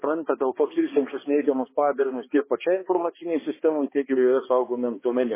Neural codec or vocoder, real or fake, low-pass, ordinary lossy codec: codec, 16 kHz, 2 kbps, X-Codec, HuBERT features, trained on balanced general audio; fake; 3.6 kHz; MP3, 16 kbps